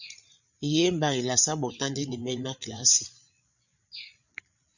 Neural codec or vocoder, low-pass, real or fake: codec, 16 kHz, 8 kbps, FreqCodec, larger model; 7.2 kHz; fake